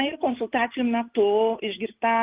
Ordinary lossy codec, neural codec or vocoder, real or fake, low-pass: Opus, 32 kbps; none; real; 3.6 kHz